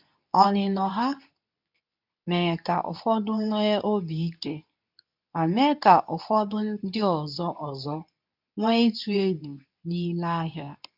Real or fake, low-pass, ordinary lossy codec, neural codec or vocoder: fake; 5.4 kHz; none; codec, 24 kHz, 0.9 kbps, WavTokenizer, medium speech release version 2